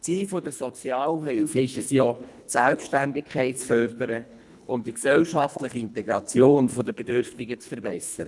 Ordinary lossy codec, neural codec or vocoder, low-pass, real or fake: none; codec, 24 kHz, 1.5 kbps, HILCodec; none; fake